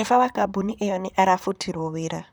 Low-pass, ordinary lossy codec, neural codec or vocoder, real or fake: none; none; vocoder, 44.1 kHz, 128 mel bands, Pupu-Vocoder; fake